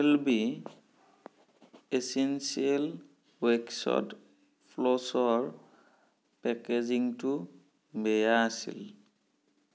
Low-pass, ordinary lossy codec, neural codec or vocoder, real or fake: none; none; none; real